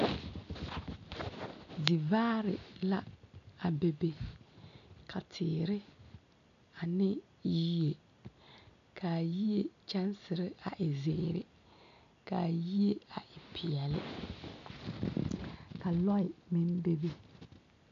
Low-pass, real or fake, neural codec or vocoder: 7.2 kHz; real; none